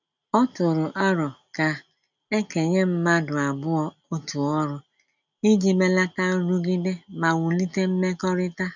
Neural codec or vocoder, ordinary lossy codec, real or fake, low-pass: none; none; real; 7.2 kHz